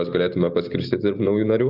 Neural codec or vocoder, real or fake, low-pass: none; real; 5.4 kHz